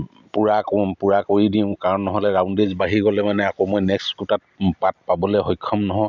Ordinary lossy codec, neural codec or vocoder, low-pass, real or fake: none; none; 7.2 kHz; real